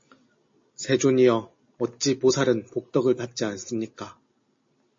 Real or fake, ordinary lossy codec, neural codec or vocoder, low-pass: real; MP3, 32 kbps; none; 7.2 kHz